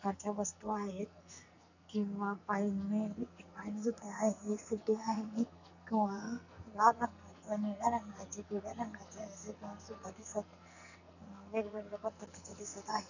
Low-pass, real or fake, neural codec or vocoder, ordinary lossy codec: 7.2 kHz; fake; codec, 44.1 kHz, 2.6 kbps, SNAC; none